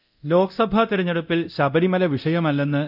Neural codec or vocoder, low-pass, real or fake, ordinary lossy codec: codec, 24 kHz, 0.9 kbps, DualCodec; 5.4 kHz; fake; none